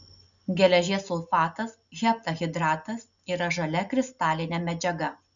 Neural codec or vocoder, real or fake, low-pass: none; real; 7.2 kHz